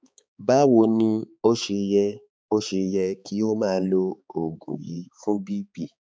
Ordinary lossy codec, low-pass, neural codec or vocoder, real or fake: none; none; codec, 16 kHz, 4 kbps, X-Codec, HuBERT features, trained on balanced general audio; fake